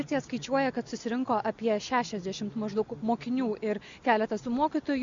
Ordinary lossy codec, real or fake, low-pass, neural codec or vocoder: Opus, 64 kbps; real; 7.2 kHz; none